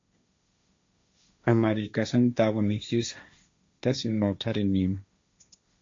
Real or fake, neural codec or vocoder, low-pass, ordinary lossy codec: fake; codec, 16 kHz, 1.1 kbps, Voila-Tokenizer; 7.2 kHz; AAC, 48 kbps